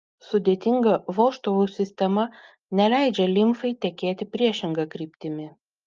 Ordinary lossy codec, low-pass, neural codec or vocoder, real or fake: Opus, 24 kbps; 7.2 kHz; none; real